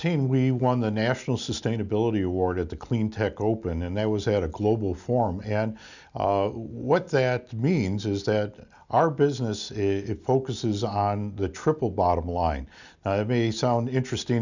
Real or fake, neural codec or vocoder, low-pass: real; none; 7.2 kHz